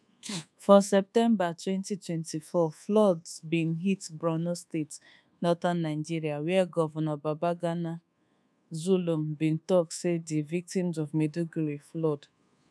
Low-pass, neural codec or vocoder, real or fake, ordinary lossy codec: none; codec, 24 kHz, 1.2 kbps, DualCodec; fake; none